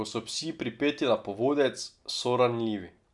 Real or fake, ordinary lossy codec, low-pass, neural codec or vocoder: real; none; 10.8 kHz; none